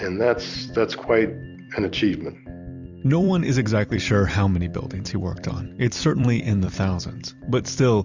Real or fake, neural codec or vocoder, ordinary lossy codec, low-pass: fake; vocoder, 44.1 kHz, 128 mel bands every 256 samples, BigVGAN v2; Opus, 64 kbps; 7.2 kHz